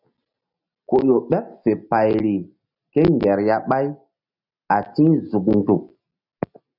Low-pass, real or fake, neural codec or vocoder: 5.4 kHz; real; none